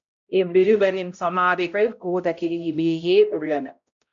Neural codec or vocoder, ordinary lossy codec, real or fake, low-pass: codec, 16 kHz, 0.5 kbps, X-Codec, HuBERT features, trained on balanced general audio; MP3, 64 kbps; fake; 7.2 kHz